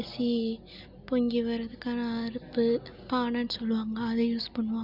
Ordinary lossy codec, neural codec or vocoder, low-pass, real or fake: Opus, 64 kbps; none; 5.4 kHz; real